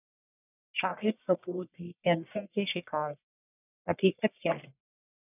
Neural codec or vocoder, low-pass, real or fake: codec, 44.1 kHz, 1.7 kbps, Pupu-Codec; 3.6 kHz; fake